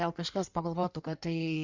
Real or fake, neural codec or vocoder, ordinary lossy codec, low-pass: fake; codec, 16 kHz in and 24 kHz out, 1.1 kbps, FireRedTTS-2 codec; Opus, 64 kbps; 7.2 kHz